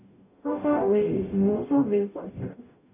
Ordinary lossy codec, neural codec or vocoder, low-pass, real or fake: none; codec, 44.1 kHz, 0.9 kbps, DAC; 3.6 kHz; fake